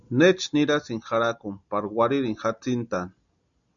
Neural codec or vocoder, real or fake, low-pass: none; real; 7.2 kHz